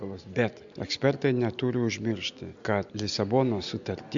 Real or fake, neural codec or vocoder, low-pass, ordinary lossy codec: real; none; 7.2 kHz; MP3, 64 kbps